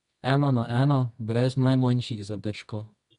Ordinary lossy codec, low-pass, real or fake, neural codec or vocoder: none; 10.8 kHz; fake; codec, 24 kHz, 0.9 kbps, WavTokenizer, medium music audio release